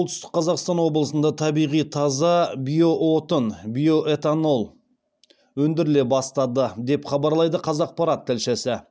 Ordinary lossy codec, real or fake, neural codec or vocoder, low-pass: none; real; none; none